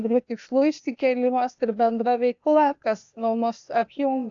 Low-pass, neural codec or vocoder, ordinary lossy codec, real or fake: 7.2 kHz; codec, 16 kHz, 0.8 kbps, ZipCodec; AAC, 64 kbps; fake